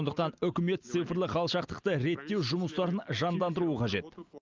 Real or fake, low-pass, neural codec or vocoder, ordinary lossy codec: real; 7.2 kHz; none; Opus, 32 kbps